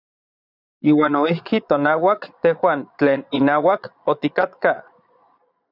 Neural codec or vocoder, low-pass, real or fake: vocoder, 22.05 kHz, 80 mel bands, Vocos; 5.4 kHz; fake